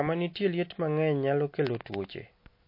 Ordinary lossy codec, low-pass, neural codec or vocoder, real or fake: MP3, 32 kbps; 5.4 kHz; none; real